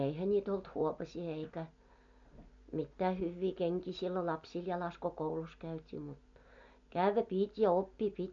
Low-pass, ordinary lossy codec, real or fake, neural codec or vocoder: 7.2 kHz; MP3, 48 kbps; real; none